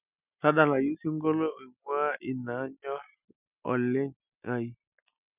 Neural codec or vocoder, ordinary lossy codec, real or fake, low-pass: none; none; real; 3.6 kHz